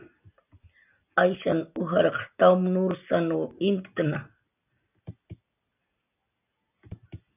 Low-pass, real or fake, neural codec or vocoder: 3.6 kHz; real; none